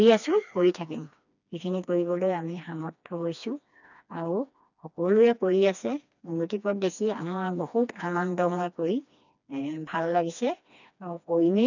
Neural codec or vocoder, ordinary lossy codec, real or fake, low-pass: codec, 16 kHz, 2 kbps, FreqCodec, smaller model; none; fake; 7.2 kHz